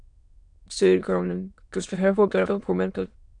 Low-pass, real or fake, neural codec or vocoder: 9.9 kHz; fake; autoencoder, 22.05 kHz, a latent of 192 numbers a frame, VITS, trained on many speakers